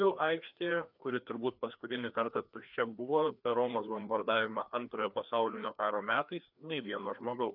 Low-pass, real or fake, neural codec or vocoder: 5.4 kHz; fake; codec, 16 kHz, 2 kbps, FreqCodec, larger model